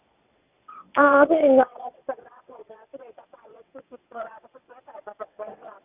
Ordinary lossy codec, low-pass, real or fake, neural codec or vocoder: none; 3.6 kHz; fake; vocoder, 22.05 kHz, 80 mel bands, WaveNeXt